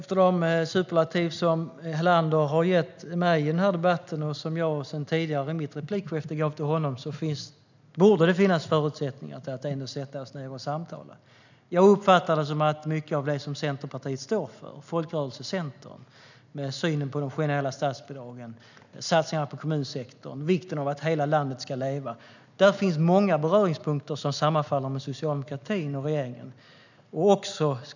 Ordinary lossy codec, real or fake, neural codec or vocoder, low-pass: none; real; none; 7.2 kHz